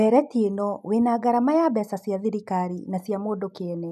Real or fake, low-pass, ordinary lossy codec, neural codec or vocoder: real; 14.4 kHz; none; none